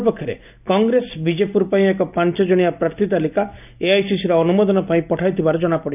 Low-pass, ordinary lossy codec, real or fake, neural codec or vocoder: 3.6 kHz; none; real; none